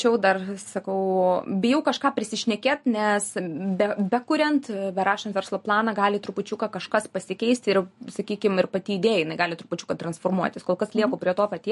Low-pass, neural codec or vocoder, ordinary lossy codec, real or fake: 14.4 kHz; none; MP3, 48 kbps; real